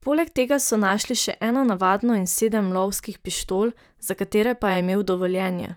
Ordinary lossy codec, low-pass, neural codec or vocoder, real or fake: none; none; vocoder, 44.1 kHz, 128 mel bands, Pupu-Vocoder; fake